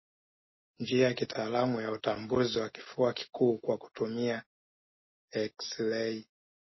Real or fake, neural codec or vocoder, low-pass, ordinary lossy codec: real; none; 7.2 kHz; MP3, 24 kbps